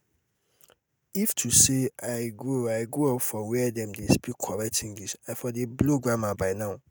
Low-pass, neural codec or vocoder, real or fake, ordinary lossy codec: none; none; real; none